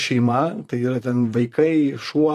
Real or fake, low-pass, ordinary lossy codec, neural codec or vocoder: fake; 14.4 kHz; AAC, 64 kbps; vocoder, 44.1 kHz, 128 mel bands, Pupu-Vocoder